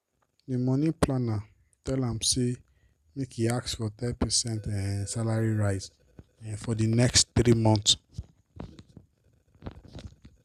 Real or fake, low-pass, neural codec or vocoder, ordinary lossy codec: real; 14.4 kHz; none; Opus, 64 kbps